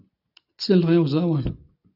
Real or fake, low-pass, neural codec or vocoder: real; 5.4 kHz; none